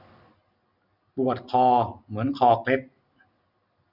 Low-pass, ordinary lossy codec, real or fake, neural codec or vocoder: 5.4 kHz; none; real; none